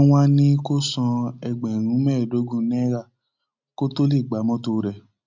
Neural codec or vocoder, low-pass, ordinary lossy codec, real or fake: none; 7.2 kHz; MP3, 64 kbps; real